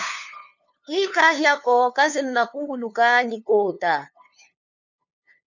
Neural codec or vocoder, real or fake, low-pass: codec, 16 kHz, 2 kbps, FunCodec, trained on LibriTTS, 25 frames a second; fake; 7.2 kHz